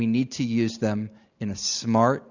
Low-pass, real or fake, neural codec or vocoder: 7.2 kHz; real; none